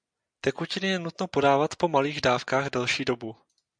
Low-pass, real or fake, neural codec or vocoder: 9.9 kHz; real; none